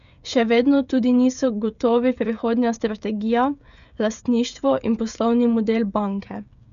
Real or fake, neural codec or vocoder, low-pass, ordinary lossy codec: fake; codec, 16 kHz, 16 kbps, FreqCodec, smaller model; 7.2 kHz; none